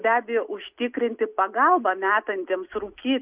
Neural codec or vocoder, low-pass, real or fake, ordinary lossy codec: none; 3.6 kHz; real; Opus, 64 kbps